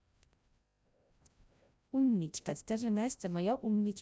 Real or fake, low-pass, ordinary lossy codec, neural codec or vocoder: fake; none; none; codec, 16 kHz, 0.5 kbps, FreqCodec, larger model